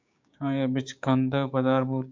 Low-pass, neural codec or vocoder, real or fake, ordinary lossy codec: 7.2 kHz; codec, 16 kHz, 6 kbps, DAC; fake; MP3, 64 kbps